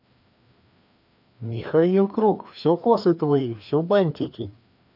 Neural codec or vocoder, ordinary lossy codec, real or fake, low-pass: codec, 16 kHz, 2 kbps, FreqCodec, larger model; none; fake; 5.4 kHz